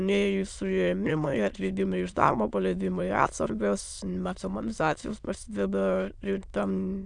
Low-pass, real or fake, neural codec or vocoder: 9.9 kHz; fake; autoencoder, 22.05 kHz, a latent of 192 numbers a frame, VITS, trained on many speakers